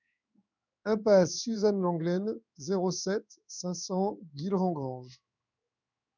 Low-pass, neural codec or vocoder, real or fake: 7.2 kHz; codec, 16 kHz in and 24 kHz out, 1 kbps, XY-Tokenizer; fake